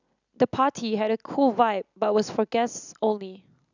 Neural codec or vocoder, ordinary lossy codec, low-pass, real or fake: none; none; 7.2 kHz; real